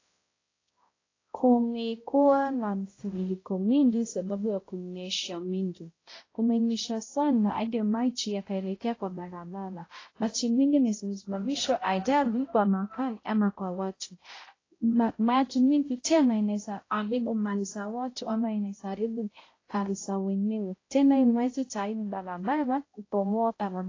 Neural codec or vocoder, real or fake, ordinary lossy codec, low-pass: codec, 16 kHz, 0.5 kbps, X-Codec, HuBERT features, trained on balanced general audio; fake; AAC, 32 kbps; 7.2 kHz